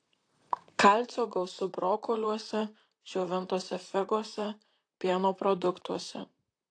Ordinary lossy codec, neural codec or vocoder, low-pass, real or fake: AAC, 48 kbps; vocoder, 44.1 kHz, 128 mel bands, Pupu-Vocoder; 9.9 kHz; fake